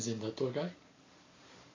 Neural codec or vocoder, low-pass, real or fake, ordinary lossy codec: none; 7.2 kHz; real; AAC, 32 kbps